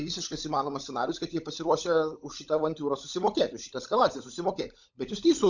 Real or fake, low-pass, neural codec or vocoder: fake; 7.2 kHz; codec, 16 kHz, 16 kbps, FunCodec, trained on LibriTTS, 50 frames a second